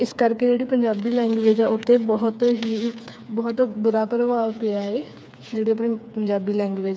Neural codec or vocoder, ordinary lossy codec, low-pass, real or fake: codec, 16 kHz, 4 kbps, FreqCodec, smaller model; none; none; fake